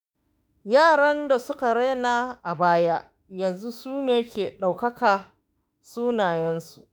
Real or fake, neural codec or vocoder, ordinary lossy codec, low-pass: fake; autoencoder, 48 kHz, 32 numbers a frame, DAC-VAE, trained on Japanese speech; none; none